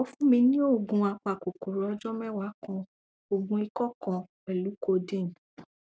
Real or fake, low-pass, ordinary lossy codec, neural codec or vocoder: real; none; none; none